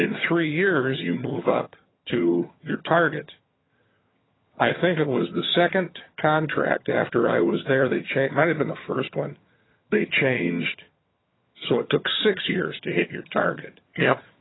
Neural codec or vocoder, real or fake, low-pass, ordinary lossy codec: vocoder, 22.05 kHz, 80 mel bands, HiFi-GAN; fake; 7.2 kHz; AAC, 16 kbps